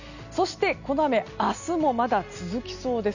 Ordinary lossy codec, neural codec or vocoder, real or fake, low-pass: none; none; real; 7.2 kHz